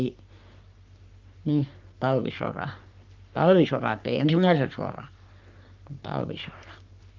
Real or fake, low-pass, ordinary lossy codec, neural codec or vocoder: fake; 7.2 kHz; Opus, 24 kbps; codec, 44.1 kHz, 3.4 kbps, Pupu-Codec